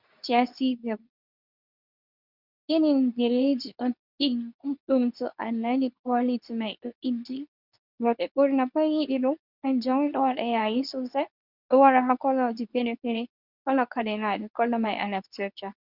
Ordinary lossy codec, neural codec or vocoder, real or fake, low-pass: Opus, 64 kbps; codec, 24 kHz, 0.9 kbps, WavTokenizer, medium speech release version 2; fake; 5.4 kHz